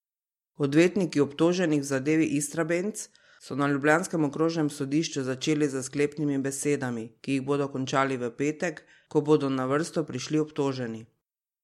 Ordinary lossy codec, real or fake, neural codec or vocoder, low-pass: MP3, 64 kbps; real; none; 19.8 kHz